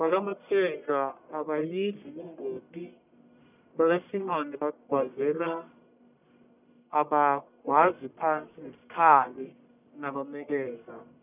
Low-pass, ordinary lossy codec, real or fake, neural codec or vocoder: 3.6 kHz; none; fake; codec, 44.1 kHz, 1.7 kbps, Pupu-Codec